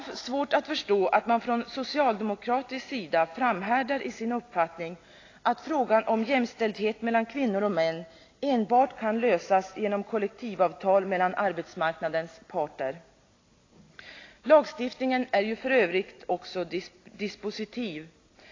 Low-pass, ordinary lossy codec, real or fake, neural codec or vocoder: 7.2 kHz; AAC, 32 kbps; real; none